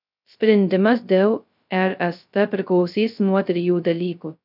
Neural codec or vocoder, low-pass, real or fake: codec, 16 kHz, 0.2 kbps, FocalCodec; 5.4 kHz; fake